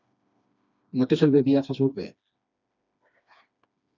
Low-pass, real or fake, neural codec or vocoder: 7.2 kHz; fake; codec, 16 kHz, 2 kbps, FreqCodec, smaller model